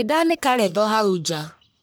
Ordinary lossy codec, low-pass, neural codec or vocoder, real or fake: none; none; codec, 44.1 kHz, 1.7 kbps, Pupu-Codec; fake